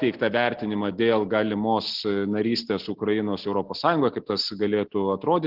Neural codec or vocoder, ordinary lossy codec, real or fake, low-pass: none; Opus, 16 kbps; real; 5.4 kHz